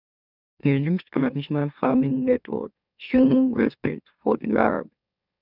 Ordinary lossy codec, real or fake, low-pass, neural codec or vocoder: none; fake; 5.4 kHz; autoencoder, 44.1 kHz, a latent of 192 numbers a frame, MeloTTS